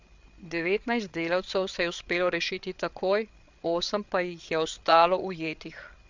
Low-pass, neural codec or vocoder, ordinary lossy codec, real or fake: 7.2 kHz; codec, 16 kHz, 8 kbps, FreqCodec, larger model; MP3, 64 kbps; fake